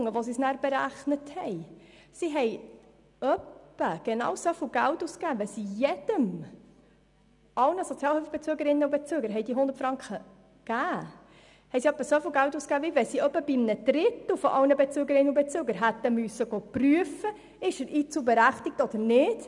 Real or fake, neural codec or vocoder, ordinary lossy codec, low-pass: real; none; none; 10.8 kHz